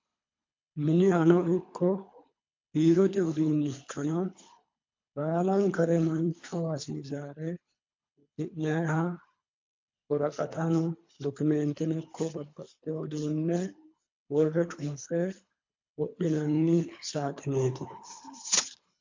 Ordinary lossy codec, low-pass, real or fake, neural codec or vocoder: MP3, 48 kbps; 7.2 kHz; fake; codec, 24 kHz, 3 kbps, HILCodec